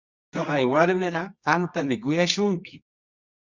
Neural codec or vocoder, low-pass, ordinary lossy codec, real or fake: codec, 24 kHz, 0.9 kbps, WavTokenizer, medium music audio release; 7.2 kHz; Opus, 64 kbps; fake